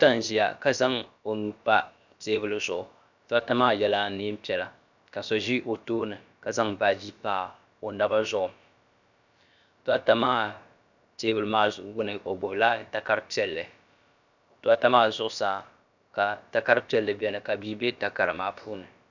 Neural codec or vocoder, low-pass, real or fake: codec, 16 kHz, about 1 kbps, DyCAST, with the encoder's durations; 7.2 kHz; fake